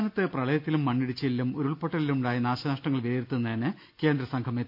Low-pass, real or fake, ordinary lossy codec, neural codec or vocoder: 5.4 kHz; real; none; none